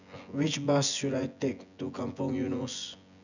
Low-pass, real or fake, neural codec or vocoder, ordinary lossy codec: 7.2 kHz; fake; vocoder, 24 kHz, 100 mel bands, Vocos; none